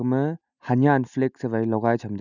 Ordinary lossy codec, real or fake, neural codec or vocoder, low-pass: none; real; none; 7.2 kHz